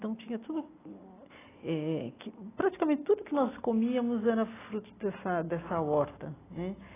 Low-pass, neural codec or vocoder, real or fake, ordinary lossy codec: 3.6 kHz; none; real; AAC, 16 kbps